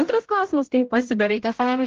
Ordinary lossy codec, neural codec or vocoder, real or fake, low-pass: Opus, 16 kbps; codec, 16 kHz, 0.5 kbps, X-Codec, HuBERT features, trained on general audio; fake; 7.2 kHz